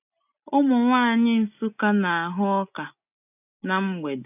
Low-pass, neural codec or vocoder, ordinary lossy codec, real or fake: 3.6 kHz; none; none; real